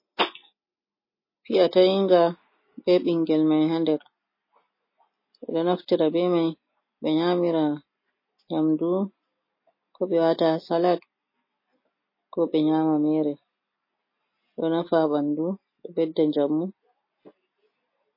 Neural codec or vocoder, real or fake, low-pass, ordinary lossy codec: none; real; 5.4 kHz; MP3, 24 kbps